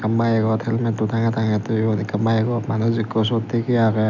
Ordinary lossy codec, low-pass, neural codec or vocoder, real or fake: none; 7.2 kHz; none; real